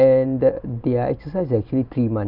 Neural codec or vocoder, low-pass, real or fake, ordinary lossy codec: vocoder, 44.1 kHz, 128 mel bands every 256 samples, BigVGAN v2; 5.4 kHz; fake; none